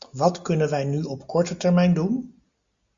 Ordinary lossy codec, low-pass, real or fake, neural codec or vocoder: Opus, 64 kbps; 7.2 kHz; real; none